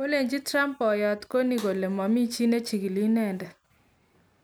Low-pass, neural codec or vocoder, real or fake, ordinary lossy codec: none; none; real; none